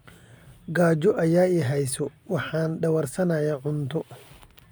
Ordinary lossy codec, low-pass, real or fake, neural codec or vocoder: none; none; real; none